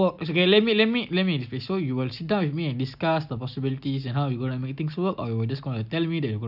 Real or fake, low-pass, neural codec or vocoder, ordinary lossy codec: real; 5.4 kHz; none; none